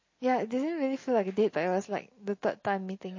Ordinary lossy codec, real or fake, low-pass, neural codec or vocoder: MP3, 32 kbps; real; 7.2 kHz; none